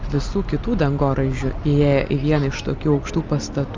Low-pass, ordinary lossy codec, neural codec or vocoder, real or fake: 7.2 kHz; Opus, 24 kbps; none; real